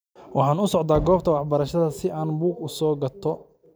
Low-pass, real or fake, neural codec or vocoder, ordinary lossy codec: none; real; none; none